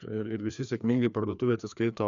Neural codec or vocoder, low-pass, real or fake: codec, 16 kHz, 2 kbps, FreqCodec, larger model; 7.2 kHz; fake